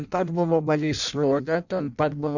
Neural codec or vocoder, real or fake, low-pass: codec, 16 kHz in and 24 kHz out, 0.6 kbps, FireRedTTS-2 codec; fake; 7.2 kHz